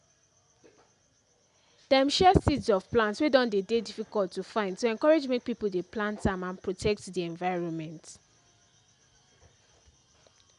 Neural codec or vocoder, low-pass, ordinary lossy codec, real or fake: none; 10.8 kHz; none; real